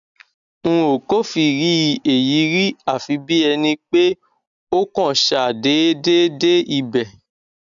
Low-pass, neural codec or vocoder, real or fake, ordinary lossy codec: 7.2 kHz; none; real; none